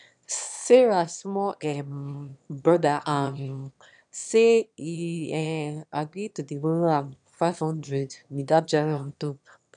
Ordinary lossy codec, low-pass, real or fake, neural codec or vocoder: none; 9.9 kHz; fake; autoencoder, 22.05 kHz, a latent of 192 numbers a frame, VITS, trained on one speaker